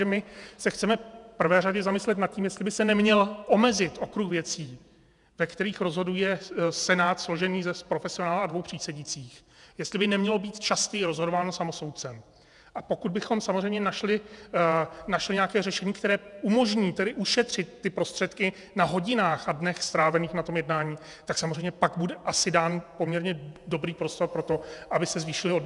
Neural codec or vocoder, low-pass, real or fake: vocoder, 48 kHz, 128 mel bands, Vocos; 10.8 kHz; fake